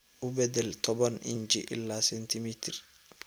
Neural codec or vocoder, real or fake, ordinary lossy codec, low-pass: none; real; none; none